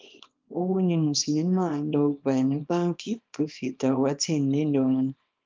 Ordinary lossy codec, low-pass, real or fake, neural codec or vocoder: Opus, 32 kbps; 7.2 kHz; fake; codec, 24 kHz, 0.9 kbps, WavTokenizer, small release